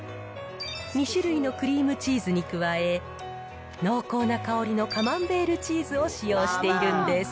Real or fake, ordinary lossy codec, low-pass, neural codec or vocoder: real; none; none; none